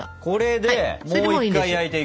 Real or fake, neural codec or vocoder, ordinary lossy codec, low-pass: real; none; none; none